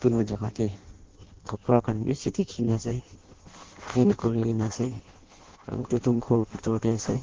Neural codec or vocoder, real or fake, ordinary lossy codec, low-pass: codec, 16 kHz in and 24 kHz out, 0.6 kbps, FireRedTTS-2 codec; fake; Opus, 16 kbps; 7.2 kHz